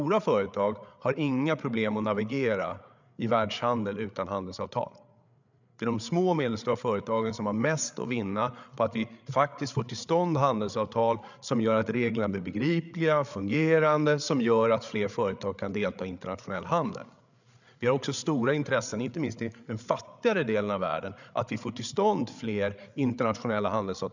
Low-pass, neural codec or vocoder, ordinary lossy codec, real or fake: 7.2 kHz; codec, 16 kHz, 8 kbps, FreqCodec, larger model; none; fake